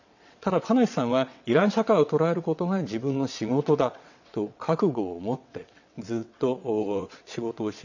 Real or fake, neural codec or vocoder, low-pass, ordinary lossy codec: fake; vocoder, 22.05 kHz, 80 mel bands, WaveNeXt; 7.2 kHz; none